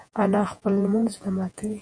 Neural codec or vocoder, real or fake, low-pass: vocoder, 48 kHz, 128 mel bands, Vocos; fake; 9.9 kHz